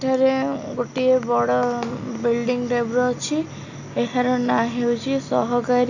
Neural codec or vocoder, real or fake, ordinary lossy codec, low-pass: none; real; none; 7.2 kHz